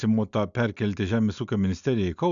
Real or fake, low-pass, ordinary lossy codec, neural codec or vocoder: real; 7.2 kHz; MP3, 96 kbps; none